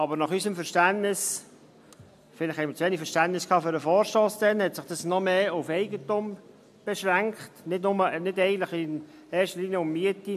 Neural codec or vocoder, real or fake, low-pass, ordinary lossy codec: none; real; 14.4 kHz; MP3, 96 kbps